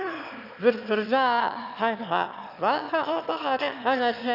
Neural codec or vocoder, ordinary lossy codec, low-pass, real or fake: autoencoder, 22.05 kHz, a latent of 192 numbers a frame, VITS, trained on one speaker; none; 5.4 kHz; fake